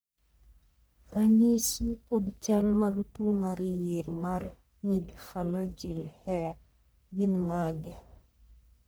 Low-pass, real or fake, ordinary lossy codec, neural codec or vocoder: none; fake; none; codec, 44.1 kHz, 1.7 kbps, Pupu-Codec